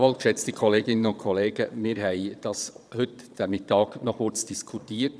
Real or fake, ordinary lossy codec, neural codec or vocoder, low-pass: fake; none; vocoder, 22.05 kHz, 80 mel bands, Vocos; none